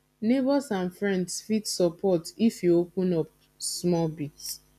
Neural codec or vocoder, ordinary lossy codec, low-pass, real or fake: none; none; 14.4 kHz; real